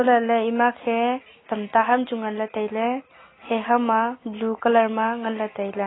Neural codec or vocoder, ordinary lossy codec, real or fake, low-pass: none; AAC, 16 kbps; real; 7.2 kHz